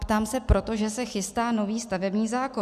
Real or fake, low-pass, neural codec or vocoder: real; 14.4 kHz; none